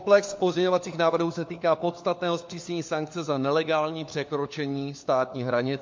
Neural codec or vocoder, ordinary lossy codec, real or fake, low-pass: codec, 16 kHz, 4 kbps, FunCodec, trained on LibriTTS, 50 frames a second; MP3, 48 kbps; fake; 7.2 kHz